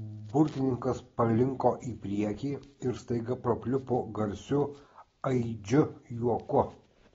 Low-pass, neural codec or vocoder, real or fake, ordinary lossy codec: 7.2 kHz; none; real; AAC, 24 kbps